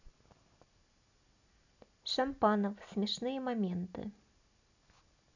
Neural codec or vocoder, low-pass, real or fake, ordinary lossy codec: none; 7.2 kHz; real; none